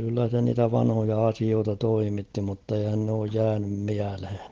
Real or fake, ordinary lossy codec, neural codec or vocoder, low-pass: real; Opus, 16 kbps; none; 7.2 kHz